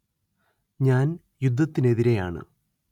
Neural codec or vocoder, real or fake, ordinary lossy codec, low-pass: none; real; none; 19.8 kHz